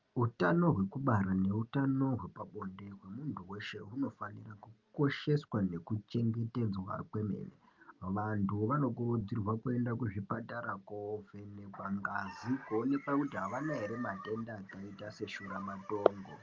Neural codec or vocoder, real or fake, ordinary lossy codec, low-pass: none; real; Opus, 24 kbps; 7.2 kHz